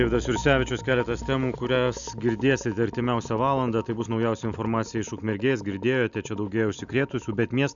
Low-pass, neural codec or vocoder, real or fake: 7.2 kHz; none; real